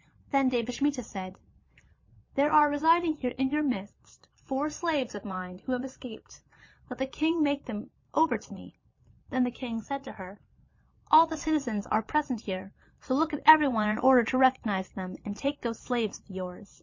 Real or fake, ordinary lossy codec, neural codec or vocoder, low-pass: fake; MP3, 32 kbps; vocoder, 22.05 kHz, 80 mel bands, Vocos; 7.2 kHz